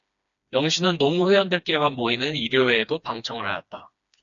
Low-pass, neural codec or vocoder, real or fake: 7.2 kHz; codec, 16 kHz, 2 kbps, FreqCodec, smaller model; fake